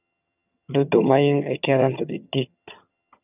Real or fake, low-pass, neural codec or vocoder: fake; 3.6 kHz; vocoder, 22.05 kHz, 80 mel bands, HiFi-GAN